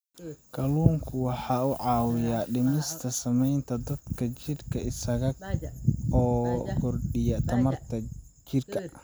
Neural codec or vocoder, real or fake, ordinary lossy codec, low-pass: none; real; none; none